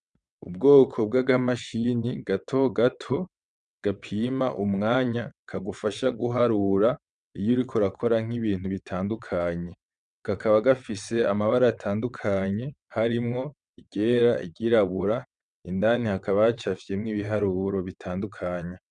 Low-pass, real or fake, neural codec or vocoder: 9.9 kHz; fake; vocoder, 22.05 kHz, 80 mel bands, WaveNeXt